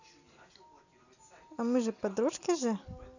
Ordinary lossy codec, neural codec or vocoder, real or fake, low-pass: none; none; real; 7.2 kHz